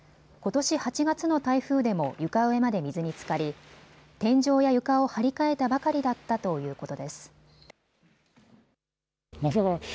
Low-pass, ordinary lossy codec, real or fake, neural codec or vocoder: none; none; real; none